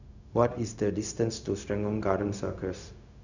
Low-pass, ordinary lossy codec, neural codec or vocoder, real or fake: 7.2 kHz; none; codec, 16 kHz, 0.4 kbps, LongCat-Audio-Codec; fake